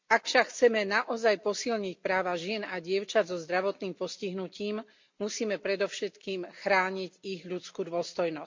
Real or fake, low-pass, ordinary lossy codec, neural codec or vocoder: real; 7.2 kHz; MP3, 48 kbps; none